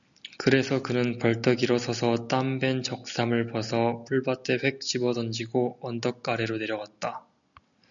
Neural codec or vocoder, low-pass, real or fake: none; 7.2 kHz; real